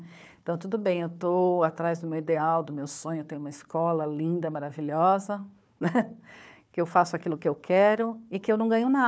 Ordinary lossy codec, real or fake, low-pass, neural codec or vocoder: none; fake; none; codec, 16 kHz, 4 kbps, FunCodec, trained on Chinese and English, 50 frames a second